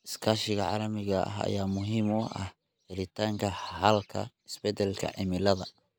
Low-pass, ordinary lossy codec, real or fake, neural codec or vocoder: none; none; real; none